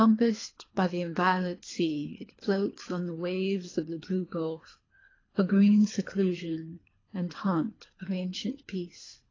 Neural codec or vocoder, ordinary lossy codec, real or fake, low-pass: codec, 24 kHz, 3 kbps, HILCodec; AAC, 32 kbps; fake; 7.2 kHz